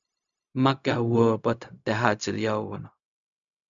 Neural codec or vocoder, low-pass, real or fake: codec, 16 kHz, 0.4 kbps, LongCat-Audio-Codec; 7.2 kHz; fake